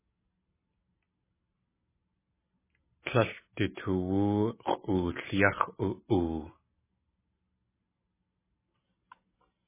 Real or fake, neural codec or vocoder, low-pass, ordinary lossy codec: real; none; 3.6 kHz; MP3, 16 kbps